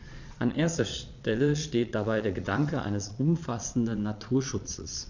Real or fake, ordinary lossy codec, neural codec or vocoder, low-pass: fake; none; vocoder, 22.05 kHz, 80 mel bands, Vocos; 7.2 kHz